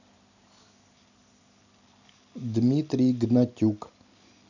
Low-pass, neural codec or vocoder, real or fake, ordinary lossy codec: 7.2 kHz; none; real; none